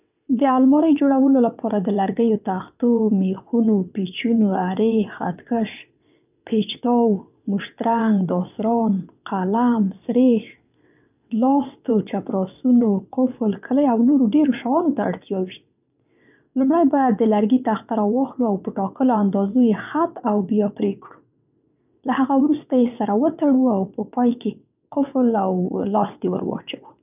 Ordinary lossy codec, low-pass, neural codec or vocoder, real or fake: none; 3.6 kHz; vocoder, 22.05 kHz, 80 mel bands, WaveNeXt; fake